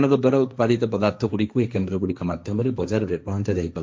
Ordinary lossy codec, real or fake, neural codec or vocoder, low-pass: none; fake; codec, 16 kHz, 1.1 kbps, Voila-Tokenizer; none